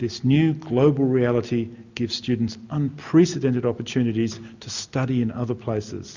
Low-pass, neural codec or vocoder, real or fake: 7.2 kHz; none; real